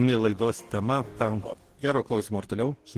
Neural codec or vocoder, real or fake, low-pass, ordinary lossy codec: codec, 44.1 kHz, 2.6 kbps, DAC; fake; 14.4 kHz; Opus, 16 kbps